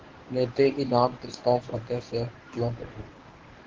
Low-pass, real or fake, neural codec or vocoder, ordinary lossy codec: 7.2 kHz; fake; codec, 24 kHz, 0.9 kbps, WavTokenizer, medium speech release version 1; Opus, 16 kbps